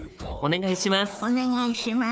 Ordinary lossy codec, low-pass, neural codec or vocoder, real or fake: none; none; codec, 16 kHz, 4 kbps, FunCodec, trained on Chinese and English, 50 frames a second; fake